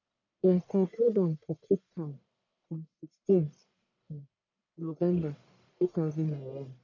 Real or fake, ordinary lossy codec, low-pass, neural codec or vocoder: fake; none; 7.2 kHz; codec, 44.1 kHz, 1.7 kbps, Pupu-Codec